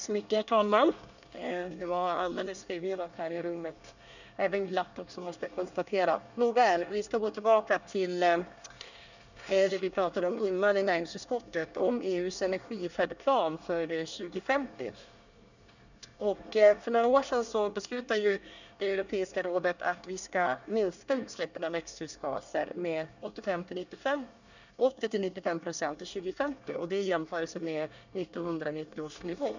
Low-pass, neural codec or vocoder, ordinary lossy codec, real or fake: 7.2 kHz; codec, 24 kHz, 1 kbps, SNAC; none; fake